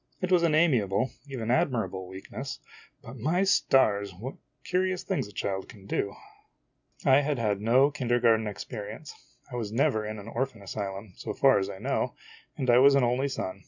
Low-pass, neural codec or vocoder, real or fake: 7.2 kHz; none; real